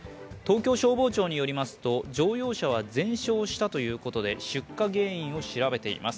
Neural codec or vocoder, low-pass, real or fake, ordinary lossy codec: none; none; real; none